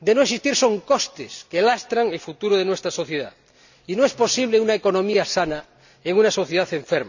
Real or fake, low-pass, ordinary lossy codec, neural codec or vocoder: real; 7.2 kHz; none; none